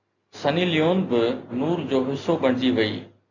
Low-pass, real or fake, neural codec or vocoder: 7.2 kHz; real; none